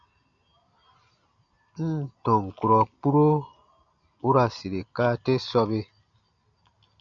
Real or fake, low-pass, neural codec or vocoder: real; 7.2 kHz; none